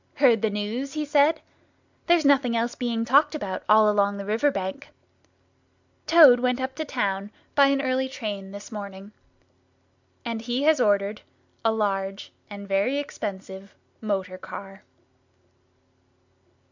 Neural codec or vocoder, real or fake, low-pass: none; real; 7.2 kHz